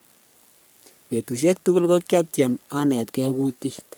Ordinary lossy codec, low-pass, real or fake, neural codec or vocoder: none; none; fake; codec, 44.1 kHz, 3.4 kbps, Pupu-Codec